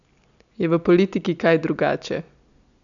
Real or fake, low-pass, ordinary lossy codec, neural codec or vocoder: real; 7.2 kHz; none; none